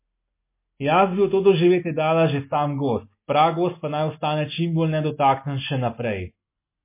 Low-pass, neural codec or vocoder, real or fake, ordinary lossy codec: 3.6 kHz; none; real; MP3, 24 kbps